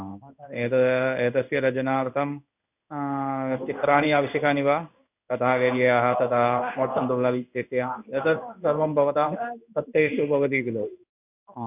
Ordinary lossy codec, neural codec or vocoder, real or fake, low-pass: none; codec, 16 kHz in and 24 kHz out, 1 kbps, XY-Tokenizer; fake; 3.6 kHz